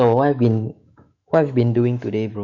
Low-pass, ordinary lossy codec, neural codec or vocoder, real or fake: 7.2 kHz; none; autoencoder, 48 kHz, 128 numbers a frame, DAC-VAE, trained on Japanese speech; fake